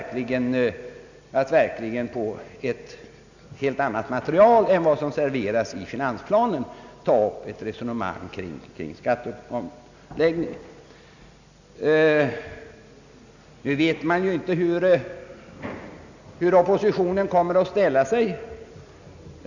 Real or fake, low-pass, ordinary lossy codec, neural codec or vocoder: real; 7.2 kHz; none; none